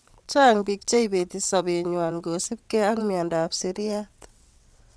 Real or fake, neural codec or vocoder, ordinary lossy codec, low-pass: fake; vocoder, 22.05 kHz, 80 mel bands, WaveNeXt; none; none